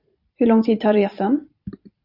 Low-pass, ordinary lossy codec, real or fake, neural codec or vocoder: 5.4 kHz; Opus, 64 kbps; fake; vocoder, 44.1 kHz, 128 mel bands every 256 samples, BigVGAN v2